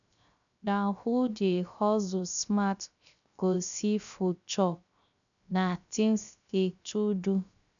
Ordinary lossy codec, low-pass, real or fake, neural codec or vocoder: none; 7.2 kHz; fake; codec, 16 kHz, 0.3 kbps, FocalCodec